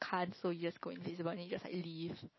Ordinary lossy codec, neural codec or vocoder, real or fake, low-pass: MP3, 24 kbps; none; real; 7.2 kHz